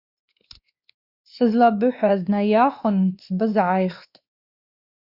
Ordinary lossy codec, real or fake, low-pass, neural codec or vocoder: Opus, 64 kbps; fake; 5.4 kHz; codec, 16 kHz, 2 kbps, X-Codec, WavLM features, trained on Multilingual LibriSpeech